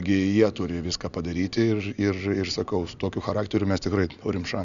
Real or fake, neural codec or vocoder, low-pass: real; none; 7.2 kHz